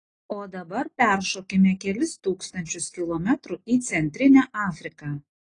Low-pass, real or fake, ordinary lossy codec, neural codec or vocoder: 9.9 kHz; real; AAC, 32 kbps; none